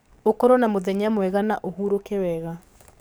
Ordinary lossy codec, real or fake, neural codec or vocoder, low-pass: none; fake; codec, 44.1 kHz, 7.8 kbps, DAC; none